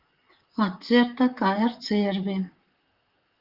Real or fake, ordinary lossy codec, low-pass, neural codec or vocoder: fake; Opus, 32 kbps; 5.4 kHz; vocoder, 44.1 kHz, 128 mel bands, Pupu-Vocoder